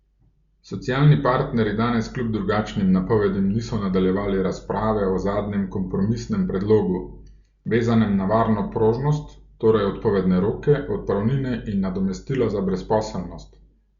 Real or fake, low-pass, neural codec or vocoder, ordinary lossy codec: real; 7.2 kHz; none; Opus, 64 kbps